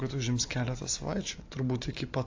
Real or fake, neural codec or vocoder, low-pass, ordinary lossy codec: real; none; 7.2 kHz; AAC, 48 kbps